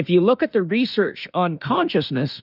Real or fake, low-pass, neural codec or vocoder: fake; 5.4 kHz; autoencoder, 48 kHz, 32 numbers a frame, DAC-VAE, trained on Japanese speech